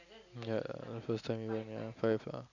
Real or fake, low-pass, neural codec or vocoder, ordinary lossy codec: real; 7.2 kHz; none; none